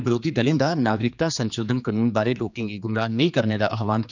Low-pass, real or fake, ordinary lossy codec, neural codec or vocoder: 7.2 kHz; fake; none; codec, 16 kHz, 2 kbps, X-Codec, HuBERT features, trained on general audio